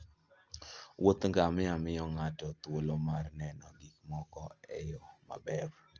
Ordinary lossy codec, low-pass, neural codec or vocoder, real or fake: Opus, 24 kbps; 7.2 kHz; none; real